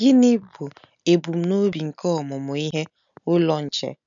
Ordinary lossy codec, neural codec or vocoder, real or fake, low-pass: none; none; real; 7.2 kHz